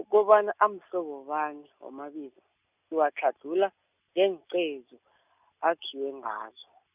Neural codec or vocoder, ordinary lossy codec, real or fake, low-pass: none; none; real; 3.6 kHz